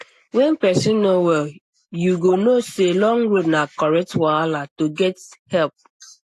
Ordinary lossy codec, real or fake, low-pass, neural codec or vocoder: AAC, 64 kbps; real; 14.4 kHz; none